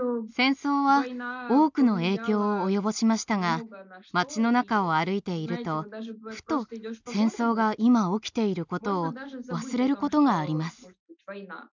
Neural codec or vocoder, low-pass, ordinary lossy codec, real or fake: none; 7.2 kHz; none; real